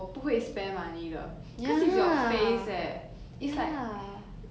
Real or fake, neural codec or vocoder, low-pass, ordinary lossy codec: real; none; none; none